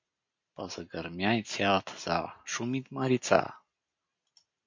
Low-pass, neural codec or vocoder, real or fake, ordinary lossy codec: 7.2 kHz; none; real; MP3, 48 kbps